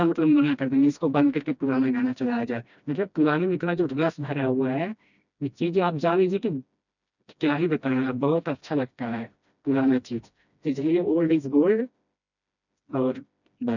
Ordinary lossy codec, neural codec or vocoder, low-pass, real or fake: none; codec, 16 kHz, 1 kbps, FreqCodec, smaller model; 7.2 kHz; fake